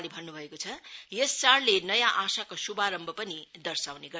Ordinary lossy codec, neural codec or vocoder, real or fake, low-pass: none; none; real; none